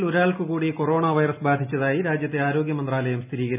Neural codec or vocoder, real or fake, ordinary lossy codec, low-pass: none; real; none; 3.6 kHz